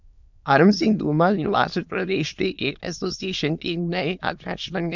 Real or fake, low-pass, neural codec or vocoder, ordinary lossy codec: fake; 7.2 kHz; autoencoder, 22.05 kHz, a latent of 192 numbers a frame, VITS, trained on many speakers; none